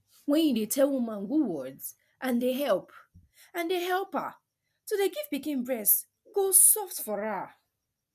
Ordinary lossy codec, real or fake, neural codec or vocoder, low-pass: none; real; none; 14.4 kHz